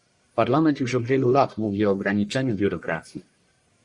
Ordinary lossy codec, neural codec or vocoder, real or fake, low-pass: Opus, 64 kbps; codec, 44.1 kHz, 1.7 kbps, Pupu-Codec; fake; 10.8 kHz